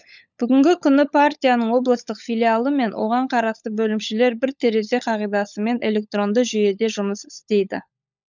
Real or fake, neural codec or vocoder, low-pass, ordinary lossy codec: fake; codec, 16 kHz, 16 kbps, FunCodec, trained on LibriTTS, 50 frames a second; 7.2 kHz; none